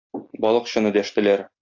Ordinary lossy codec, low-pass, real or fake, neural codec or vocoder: AAC, 48 kbps; 7.2 kHz; real; none